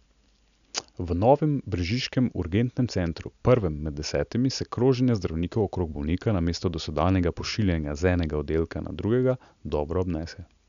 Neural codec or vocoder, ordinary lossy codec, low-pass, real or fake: none; none; 7.2 kHz; real